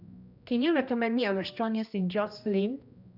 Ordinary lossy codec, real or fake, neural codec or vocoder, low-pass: none; fake; codec, 16 kHz, 1 kbps, X-Codec, HuBERT features, trained on general audio; 5.4 kHz